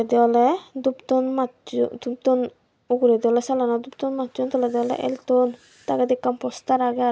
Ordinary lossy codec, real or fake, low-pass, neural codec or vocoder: none; real; none; none